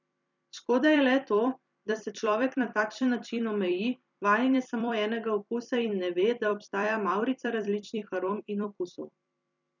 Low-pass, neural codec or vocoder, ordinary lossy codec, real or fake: 7.2 kHz; vocoder, 44.1 kHz, 128 mel bands every 256 samples, BigVGAN v2; none; fake